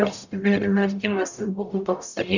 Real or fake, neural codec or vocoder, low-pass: fake; codec, 44.1 kHz, 0.9 kbps, DAC; 7.2 kHz